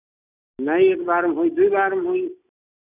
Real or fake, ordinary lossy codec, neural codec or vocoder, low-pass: real; none; none; 3.6 kHz